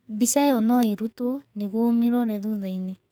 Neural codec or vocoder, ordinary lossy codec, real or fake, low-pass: codec, 44.1 kHz, 2.6 kbps, SNAC; none; fake; none